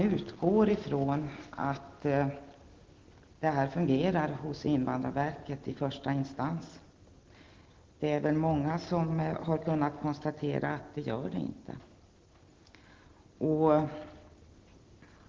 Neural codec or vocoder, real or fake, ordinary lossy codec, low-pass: none; real; Opus, 16 kbps; 7.2 kHz